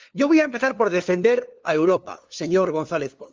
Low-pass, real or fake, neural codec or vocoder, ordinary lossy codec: 7.2 kHz; fake; codec, 16 kHz, 2 kbps, FunCodec, trained on LibriTTS, 25 frames a second; Opus, 32 kbps